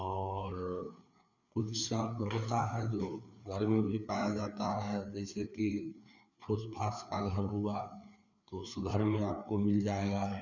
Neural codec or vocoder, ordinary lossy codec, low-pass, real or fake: codec, 16 kHz, 4 kbps, FreqCodec, larger model; none; 7.2 kHz; fake